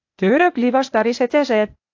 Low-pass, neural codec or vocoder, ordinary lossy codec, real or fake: 7.2 kHz; codec, 16 kHz, 0.8 kbps, ZipCodec; AAC, 48 kbps; fake